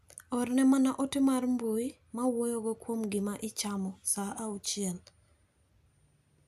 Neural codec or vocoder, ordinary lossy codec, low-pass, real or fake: none; none; 14.4 kHz; real